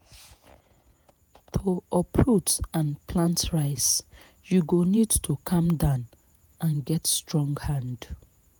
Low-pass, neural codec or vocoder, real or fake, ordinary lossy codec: none; vocoder, 48 kHz, 128 mel bands, Vocos; fake; none